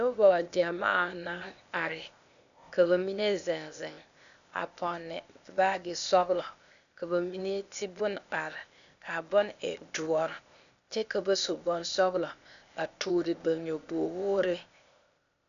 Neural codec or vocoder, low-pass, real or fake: codec, 16 kHz, 0.8 kbps, ZipCodec; 7.2 kHz; fake